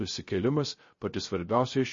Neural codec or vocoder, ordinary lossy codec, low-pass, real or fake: codec, 16 kHz, 0.3 kbps, FocalCodec; MP3, 32 kbps; 7.2 kHz; fake